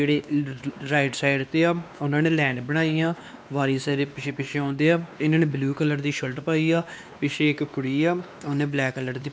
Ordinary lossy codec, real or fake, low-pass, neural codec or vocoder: none; fake; none; codec, 16 kHz, 2 kbps, X-Codec, WavLM features, trained on Multilingual LibriSpeech